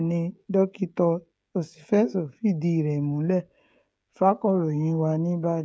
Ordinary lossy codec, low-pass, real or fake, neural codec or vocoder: none; none; fake; codec, 16 kHz, 16 kbps, FreqCodec, smaller model